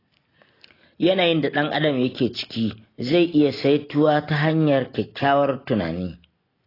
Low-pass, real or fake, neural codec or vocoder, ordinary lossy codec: 5.4 kHz; real; none; AAC, 24 kbps